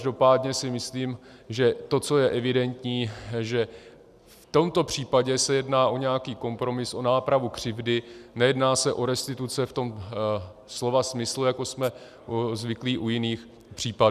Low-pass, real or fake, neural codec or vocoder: 14.4 kHz; real; none